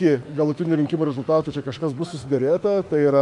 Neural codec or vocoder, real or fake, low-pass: autoencoder, 48 kHz, 32 numbers a frame, DAC-VAE, trained on Japanese speech; fake; 10.8 kHz